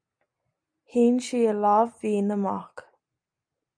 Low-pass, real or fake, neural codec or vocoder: 9.9 kHz; real; none